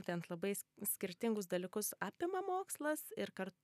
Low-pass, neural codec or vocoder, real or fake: 14.4 kHz; none; real